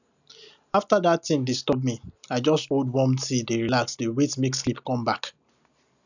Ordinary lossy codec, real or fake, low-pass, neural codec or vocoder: none; real; 7.2 kHz; none